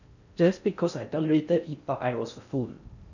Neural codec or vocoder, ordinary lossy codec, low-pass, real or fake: codec, 16 kHz in and 24 kHz out, 0.6 kbps, FocalCodec, streaming, 4096 codes; none; 7.2 kHz; fake